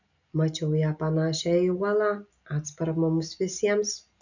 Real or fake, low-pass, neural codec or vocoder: real; 7.2 kHz; none